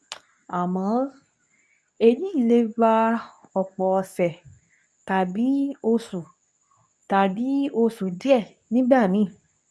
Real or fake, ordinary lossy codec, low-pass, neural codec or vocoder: fake; none; none; codec, 24 kHz, 0.9 kbps, WavTokenizer, medium speech release version 2